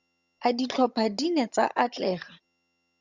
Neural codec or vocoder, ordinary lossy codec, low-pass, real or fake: vocoder, 22.05 kHz, 80 mel bands, HiFi-GAN; Opus, 64 kbps; 7.2 kHz; fake